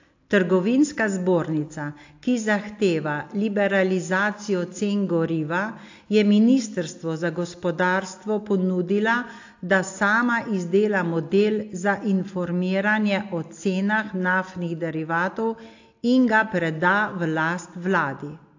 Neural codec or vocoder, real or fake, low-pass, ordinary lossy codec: none; real; 7.2 kHz; AAC, 48 kbps